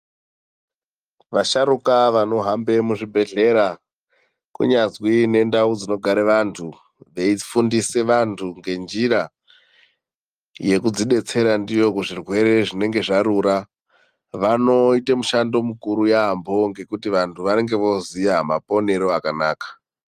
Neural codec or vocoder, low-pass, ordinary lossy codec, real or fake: none; 14.4 kHz; Opus, 32 kbps; real